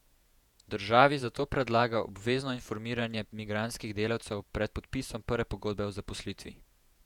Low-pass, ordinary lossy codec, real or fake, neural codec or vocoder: 19.8 kHz; none; fake; vocoder, 48 kHz, 128 mel bands, Vocos